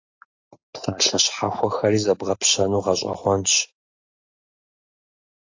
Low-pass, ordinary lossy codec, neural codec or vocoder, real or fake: 7.2 kHz; AAC, 48 kbps; none; real